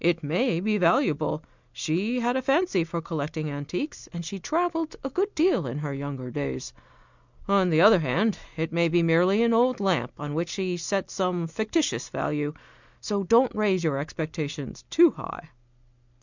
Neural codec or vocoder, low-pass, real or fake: none; 7.2 kHz; real